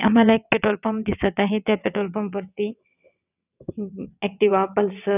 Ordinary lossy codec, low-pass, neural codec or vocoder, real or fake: none; 3.6 kHz; vocoder, 22.05 kHz, 80 mel bands, WaveNeXt; fake